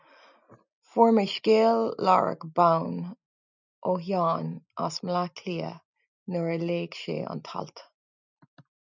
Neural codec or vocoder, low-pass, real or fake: none; 7.2 kHz; real